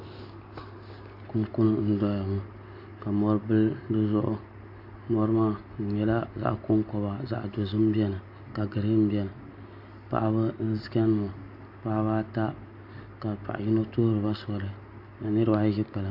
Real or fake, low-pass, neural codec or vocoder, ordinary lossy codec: real; 5.4 kHz; none; AAC, 48 kbps